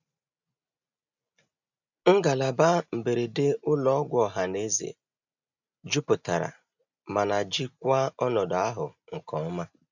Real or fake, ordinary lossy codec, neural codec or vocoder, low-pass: real; none; none; 7.2 kHz